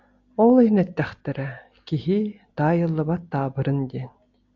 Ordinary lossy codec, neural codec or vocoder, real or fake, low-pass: AAC, 48 kbps; none; real; 7.2 kHz